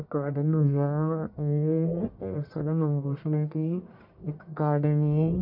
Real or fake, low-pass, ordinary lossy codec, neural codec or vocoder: fake; 5.4 kHz; none; codec, 44.1 kHz, 1.7 kbps, Pupu-Codec